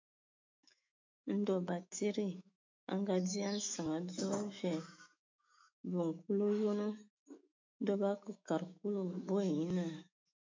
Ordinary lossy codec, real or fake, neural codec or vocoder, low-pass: MP3, 64 kbps; fake; autoencoder, 48 kHz, 128 numbers a frame, DAC-VAE, trained on Japanese speech; 7.2 kHz